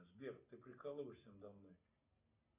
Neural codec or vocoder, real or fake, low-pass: none; real; 3.6 kHz